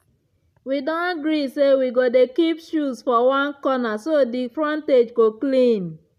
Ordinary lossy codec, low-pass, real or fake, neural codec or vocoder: none; 14.4 kHz; real; none